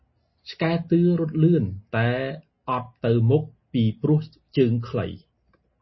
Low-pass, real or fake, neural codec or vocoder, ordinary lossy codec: 7.2 kHz; real; none; MP3, 24 kbps